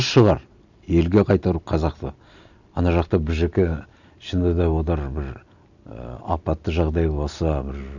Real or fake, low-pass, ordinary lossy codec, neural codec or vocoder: real; 7.2 kHz; none; none